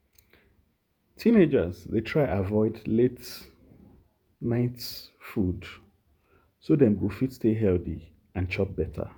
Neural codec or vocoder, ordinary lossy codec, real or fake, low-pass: vocoder, 48 kHz, 128 mel bands, Vocos; none; fake; none